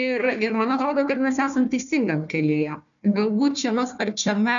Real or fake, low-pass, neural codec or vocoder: fake; 7.2 kHz; codec, 16 kHz, 1 kbps, FunCodec, trained on Chinese and English, 50 frames a second